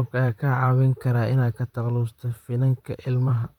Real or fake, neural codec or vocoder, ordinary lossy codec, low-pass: fake; vocoder, 44.1 kHz, 128 mel bands, Pupu-Vocoder; none; 19.8 kHz